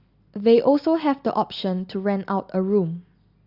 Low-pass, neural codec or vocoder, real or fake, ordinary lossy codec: 5.4 kHz; none; real; Opus, 64 kbps